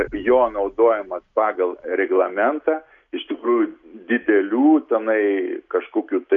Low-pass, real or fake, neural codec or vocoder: 7.2 kHz; real; none